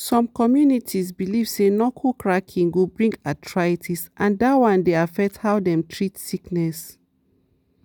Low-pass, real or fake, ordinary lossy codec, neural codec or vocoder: none; real; none; none